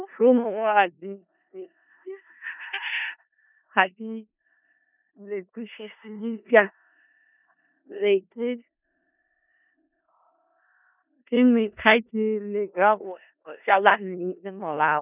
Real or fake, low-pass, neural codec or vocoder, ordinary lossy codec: fake; 3.6 kHz; codec, 16 kHz in and 24 kHz out, 0.4 kbps, LongCat-Audio-Codec, four codebook decoder; none